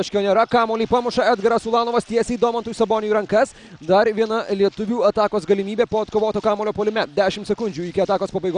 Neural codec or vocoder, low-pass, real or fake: none; 9.9 kHz; real